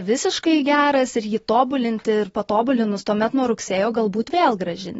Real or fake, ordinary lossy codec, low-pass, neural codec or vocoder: real; AAC, 24 kbps; 19.8 kHz; none